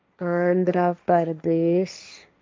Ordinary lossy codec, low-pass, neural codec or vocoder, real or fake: none; none; codec, 16 kHz, 1.1 kbps, Voila-Tokenizer; fake